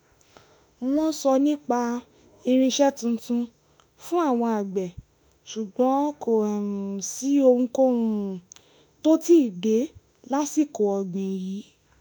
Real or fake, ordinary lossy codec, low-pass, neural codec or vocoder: fake; none; none; autoencoder, 48 kHz, 32 numbers a frame, DAC-VAE, trained on Japanese speech